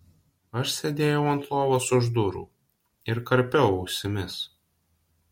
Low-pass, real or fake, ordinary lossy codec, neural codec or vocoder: 19.8 kHz; real; MP3, 64 kbps; none